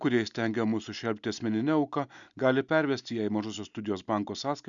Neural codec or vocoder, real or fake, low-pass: none; real; 7.2 kHz